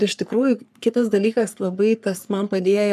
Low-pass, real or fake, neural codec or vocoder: 14.4 kHz; fake; codec, 44.1 kHz, 3.4 kbps, Pupu-Codec